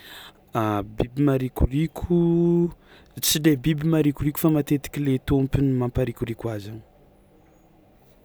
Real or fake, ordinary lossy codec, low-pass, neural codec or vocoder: real; none; none; none